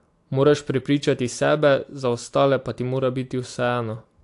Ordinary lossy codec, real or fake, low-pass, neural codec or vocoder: AAC, 64 kbps; real; 10.8 kHz; none